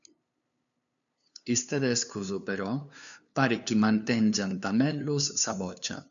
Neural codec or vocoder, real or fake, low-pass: codec, 16 kHz, 2 kbps, FunCodec, trained on LibriTTS, 25 frames a second; fake; 7.2 kHz